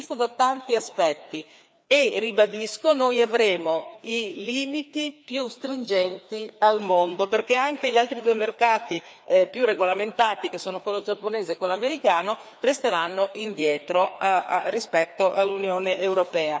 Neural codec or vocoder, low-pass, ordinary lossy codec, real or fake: codec, 16 kHz, 2 kbps, FreqCodec, larger model; none; none; fake